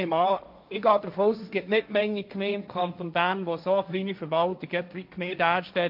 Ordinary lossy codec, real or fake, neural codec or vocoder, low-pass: none; fake; codec, 16 kHz, 1.1 kbps, Voila-Tokenizer; 5.4 kHz